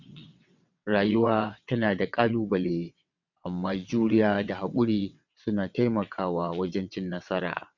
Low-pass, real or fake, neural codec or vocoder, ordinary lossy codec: 7.2 kHz; fake; vocoder, 22.05 kHz, 80 mel bands, WaveNeXt; none